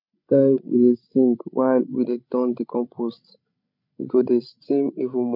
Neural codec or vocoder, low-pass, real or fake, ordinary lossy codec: codec, 16 kHz, 16 kbps, FreqCodec, larger model; 5.4 kHz; fake; none